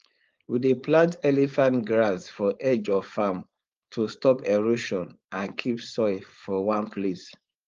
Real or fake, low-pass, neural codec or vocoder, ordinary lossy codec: fake; 7.2 kHz; codec, 16 kHz, 4.8 kbps, FACodec; Opus, 32 kbps